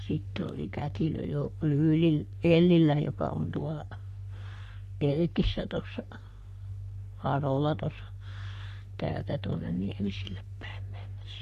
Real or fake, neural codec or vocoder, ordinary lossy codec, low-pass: fake; codec, 44.1 kHz, 3.4 kbps, Pupu-Codec; none; 14.4 kHz